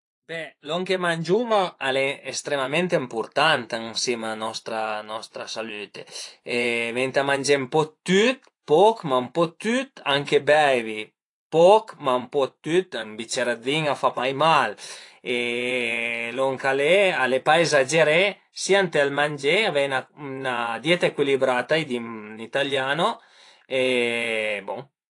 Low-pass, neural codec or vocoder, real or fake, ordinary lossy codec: 10.8 kHz; vocoder, 24 kHz, 100 mel bands, Vocos; fake; AAC, 48 kbps